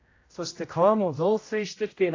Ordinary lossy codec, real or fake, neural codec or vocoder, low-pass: AAC, 32 kbps; fake; codec, 16 kHz, 0.5 kbps, X-Codec, HuBERT features, trained on general audio; 7.2 kHz